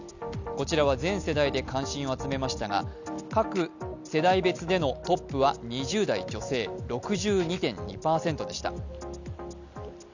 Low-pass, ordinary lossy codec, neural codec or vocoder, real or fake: 7.2 kHz; none; none; real